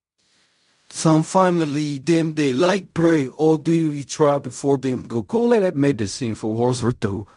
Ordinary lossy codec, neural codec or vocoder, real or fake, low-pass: MP3, 64 kbps; codec, 16 kHz in and 24 kHz out, 0.4 kbps, LongCat-Audio-Codec, fine tuned four codebook decoder; fake; 10.8 kHz